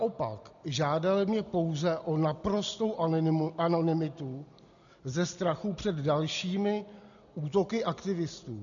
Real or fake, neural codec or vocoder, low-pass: real; none; 7.2 kHz